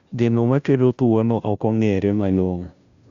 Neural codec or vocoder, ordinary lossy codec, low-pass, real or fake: codec, 16 kHz, 0.5 kbps, FunCodec, trained on Chinese and English, 25 frames a second; Opus, 64 kbps; 7.2 kHz; fake